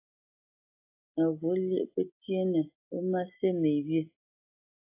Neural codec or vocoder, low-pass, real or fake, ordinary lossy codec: none; 3.6 kHz; real; AAC, 32 kbps